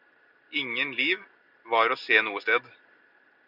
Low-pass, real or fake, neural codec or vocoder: 5.4 kHz; real; none